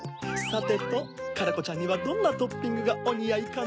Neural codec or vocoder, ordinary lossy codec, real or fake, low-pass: none; none; real; none